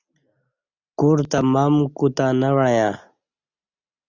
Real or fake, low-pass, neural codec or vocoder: real; 7.2 kHz; none